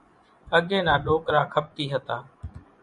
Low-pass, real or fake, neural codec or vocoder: 10.8 kHz; fake; vocoder, 24 kHz, 100 mel bands, Vocos